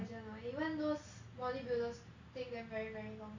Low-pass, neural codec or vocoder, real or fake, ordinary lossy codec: 7.2 kHz; none; real; MP3, 64 kbps